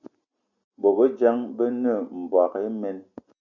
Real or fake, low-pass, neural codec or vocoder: real; 7.2 kHz; none